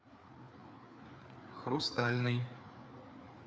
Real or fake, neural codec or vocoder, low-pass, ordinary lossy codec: fake; codec, 16 kHz, 4 kbps, FreqCodec, larger model; none; none